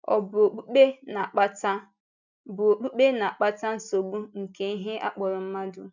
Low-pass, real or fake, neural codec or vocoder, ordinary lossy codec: 7.2 kHz; real; none; none